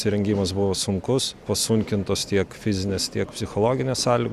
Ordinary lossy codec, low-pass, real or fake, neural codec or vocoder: Opus, 64 kbps; 14.4 kHz; real; none